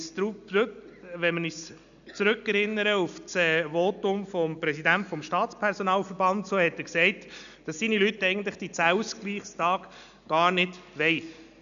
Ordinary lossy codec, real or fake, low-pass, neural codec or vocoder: none; real; 7.2 kHz; none